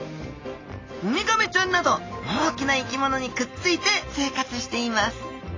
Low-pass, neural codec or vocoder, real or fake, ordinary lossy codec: 7.2 kHz; none; real; AAC, 32 kbps